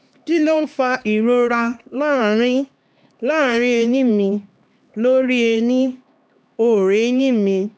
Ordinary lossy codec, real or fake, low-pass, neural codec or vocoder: none; fake; none; codec, 16 kHz, 2 kbps, X-Codec, HuBERT features, trained on LibriSpeech